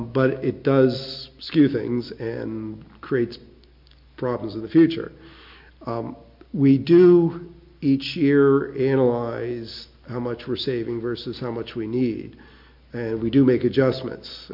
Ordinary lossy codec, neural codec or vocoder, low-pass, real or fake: MP3, 48 kbps; none; 5.4 kHz; real